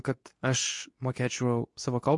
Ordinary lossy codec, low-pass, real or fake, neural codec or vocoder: MP3, 48 kbps; 10.8 kHz; fake; codec, 24 kHz, 0.9 kbps, WavTokenizer, medium speech release version 2